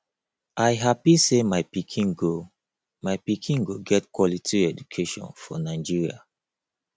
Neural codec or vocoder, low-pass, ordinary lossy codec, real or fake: none; none; none; real